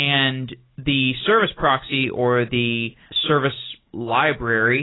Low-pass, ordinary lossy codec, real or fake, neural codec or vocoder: 7.2 kHz; AAC, 16 kbps; real; none